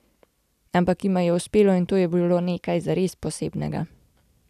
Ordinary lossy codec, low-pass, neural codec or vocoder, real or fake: none; 14.4 kHz; none; real